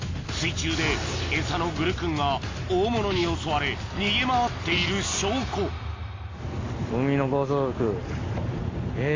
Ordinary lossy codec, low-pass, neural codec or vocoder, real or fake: AAC, 32 kbps; 7.2 kHz; none; real